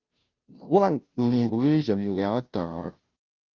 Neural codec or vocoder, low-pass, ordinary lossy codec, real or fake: codec, 16 kHz, 0.5 kbps, FunCodec, trained on Chinese and English, 25 frames a second; 7.2 kHz; Opus, 24 kbps; fake